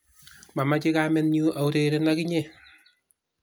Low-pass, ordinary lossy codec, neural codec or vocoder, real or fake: none; none; none; real